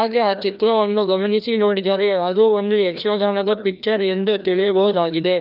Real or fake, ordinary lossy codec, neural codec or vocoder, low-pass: fake; none; codec, 16 kHz, 1 kbps, FreqCodec, larger model; 5.4 kHz